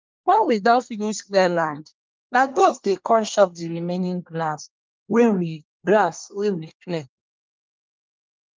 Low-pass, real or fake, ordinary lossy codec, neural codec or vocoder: 7.2 kHz; fake; Opus, 24 kbps; codec, 24 kHz, 1 kbps, SNAC